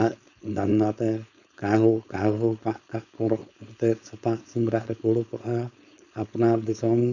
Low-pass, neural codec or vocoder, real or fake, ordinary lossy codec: 7.2 kHz; codec, 16 kHz, 4.8 kbps, FACodec; fake; none